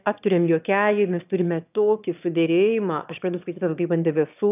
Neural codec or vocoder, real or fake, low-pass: autoencoder, 22.05 kHz, a latent of 192 numbers a frame, VITS, trained on one speaker; fake; 3.6 kHz